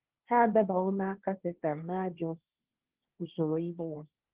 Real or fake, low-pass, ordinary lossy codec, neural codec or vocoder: fake; 3.6 kHz; Opus, 16 kbps; codec, 16 kHz, 1.1 kbps, Voila-Tokenizer